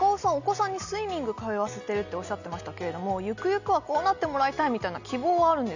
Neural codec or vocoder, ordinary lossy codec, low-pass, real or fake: none; none; 7.2 kHz; real